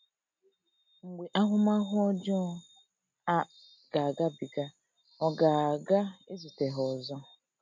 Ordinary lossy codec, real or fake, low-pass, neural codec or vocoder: MP3, 64 kbps; real; 7.2 kHz; none